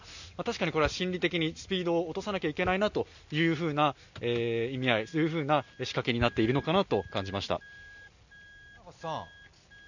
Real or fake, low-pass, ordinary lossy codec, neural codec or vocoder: real; 7.2 kHz; none; none